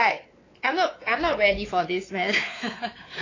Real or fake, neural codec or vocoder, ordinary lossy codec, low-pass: fake; codec, 16 kHz, 4 kbps, X-Codec, WavLM features, trained on Multilingual LibriSpeech; AAC, 32 kbps; 7.2 kHz